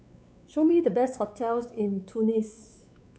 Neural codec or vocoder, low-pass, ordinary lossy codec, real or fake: codec, 16 kHz, 4 kbps, X-Codec, WavLM features, trained on Multilingual LibriSpeech; none; none; fake